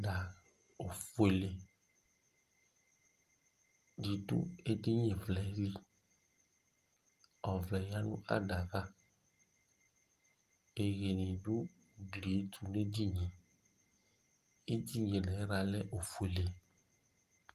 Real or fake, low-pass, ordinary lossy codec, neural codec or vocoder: real; 14.4 kHz; Opus, 24 kbps; none